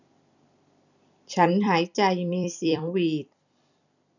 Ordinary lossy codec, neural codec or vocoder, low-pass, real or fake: none; vocoder, 44.1 kHz, 128 mel bands every 256 samples, BigVGAN v2; 7.2 kHz; fake